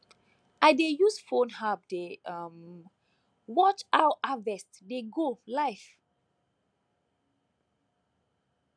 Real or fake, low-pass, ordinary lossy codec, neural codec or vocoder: real; 9.9 kHz; none; none